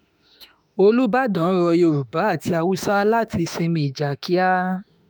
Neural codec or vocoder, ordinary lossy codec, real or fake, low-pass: autoencoder, 48 kHz, 32 numbers a frame, DAC-VAE, trained on Japanese speech; none; fake; none